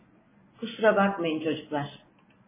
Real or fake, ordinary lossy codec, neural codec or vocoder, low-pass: real; MP3, 16 kbps; none; 3.6 kHz